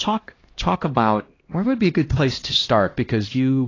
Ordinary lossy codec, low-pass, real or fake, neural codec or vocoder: AAC, 32 kbps; 7.2 kHz; fake; codec, 16 kHz, 1 kbps, X-Codec, HuBERT features, trained on LibriSpeech